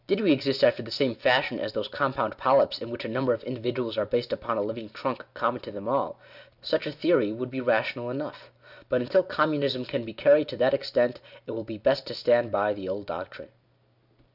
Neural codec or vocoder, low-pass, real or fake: none; 5.4 kHz; real